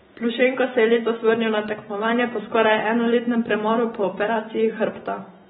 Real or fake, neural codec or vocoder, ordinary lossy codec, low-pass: real; none; AAC, 16 kbps; 19.8 kHz